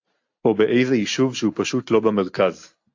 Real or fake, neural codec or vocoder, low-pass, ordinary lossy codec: real; none; 7.2 kHz; AAC, 48 kbps